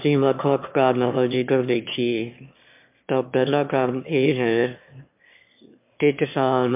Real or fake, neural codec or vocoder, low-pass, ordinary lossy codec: fake; autoencoder, 22.05 kHz, a latent of 192 numbers a frame, VITS, trained on one speaker; 3.6 kHz; MP3, 32 kbps